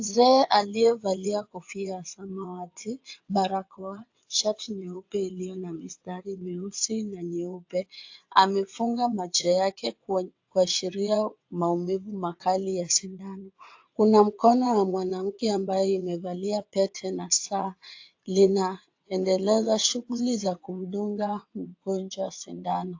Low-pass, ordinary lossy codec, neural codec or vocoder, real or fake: 7.2 kHz; AAC, 48 kbps; vocoder, 22.05 kHz, 80 mel bands, WaveNeXt; fake